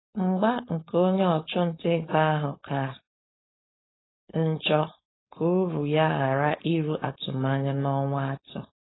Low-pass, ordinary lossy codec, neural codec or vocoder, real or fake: 7.2 kHz; AAC, 16 kbps; codec, 16 kHz, 4.8 kbps, FACodec; fake